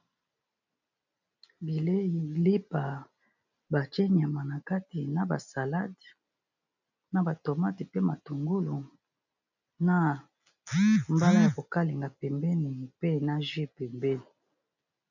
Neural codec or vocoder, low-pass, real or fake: none; 7.2 kHz; real